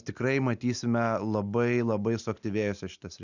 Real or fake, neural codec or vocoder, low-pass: real; none; 7.2 kHz